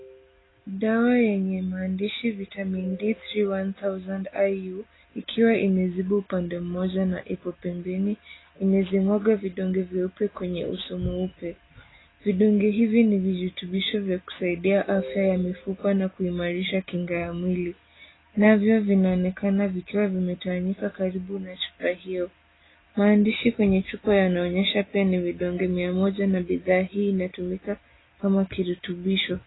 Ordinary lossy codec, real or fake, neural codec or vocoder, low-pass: AAC, 16 kbps; real; none; 7.2 kHz